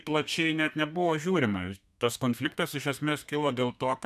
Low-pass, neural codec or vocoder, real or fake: 14.4 kHz; codec, 32 kHz, 1.9 kbps, SNAC; fake